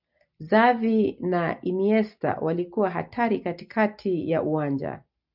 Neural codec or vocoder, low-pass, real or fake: none; 5.4 kHz; real